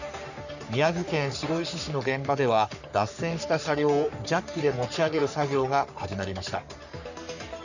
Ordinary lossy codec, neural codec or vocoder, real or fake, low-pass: none; codec, 44.1 kHz, 3.4 kbps, Pupu-Codec; fake; 7.2 kHz